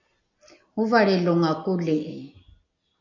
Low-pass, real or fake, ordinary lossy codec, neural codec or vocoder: 7.2 kHz; real; MP3, 64 kbps; none